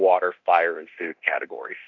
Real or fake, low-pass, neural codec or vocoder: fake; 7.2 kHz; codec, 24 kHz, 0.9 kbps, DualCodec